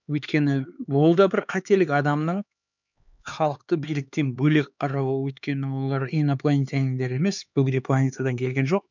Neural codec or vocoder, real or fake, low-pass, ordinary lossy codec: codec, 16 kHz, 4 kbps, X-Codec, HuBERT features, trained on LibriSpeech; fake; 7.2 kHz; none